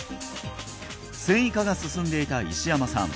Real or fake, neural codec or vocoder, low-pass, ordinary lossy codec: real; none; none; none